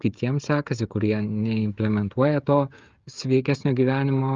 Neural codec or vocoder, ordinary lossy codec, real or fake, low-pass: codec, 16 kHz, 16 kbps, FreqCodec, smaller model; Opus, 32 kbps; fake; 7.2 kHz